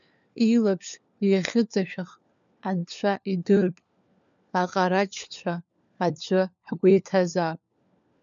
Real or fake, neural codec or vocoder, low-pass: fake; codec, 16 kHz, 4 kbps, FunCodec, trained on LibriTTS, 50 frames a second; 7.2 kHz